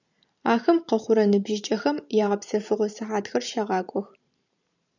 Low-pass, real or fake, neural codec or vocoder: 7.2 kHz; fake; vocoder, 44.1 kHz, 128 mel bands every 512 samples, BigVGAN v2